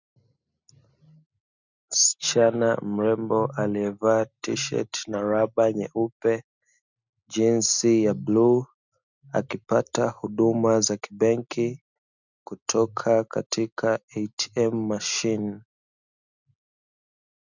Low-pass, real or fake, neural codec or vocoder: 7.2 kHz; real; none